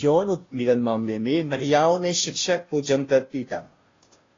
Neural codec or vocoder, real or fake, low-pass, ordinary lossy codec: codec, 16 kHz, 0.5 kbps, FunCodec, trained on Chinese and English, 25 frames a second; fake; 7.2 kHz; AAC, 32 kbps